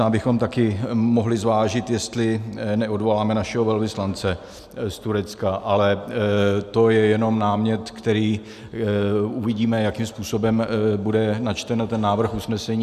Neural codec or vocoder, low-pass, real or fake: none; 14.4 kHz; real